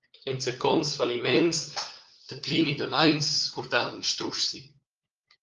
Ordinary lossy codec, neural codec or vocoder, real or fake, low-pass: Opus, 32 kbps; codec, 16 kHz, 4 kbps, FunCodec, trained on LibriTTS, 50 frames a second; fake; 7.2 kHz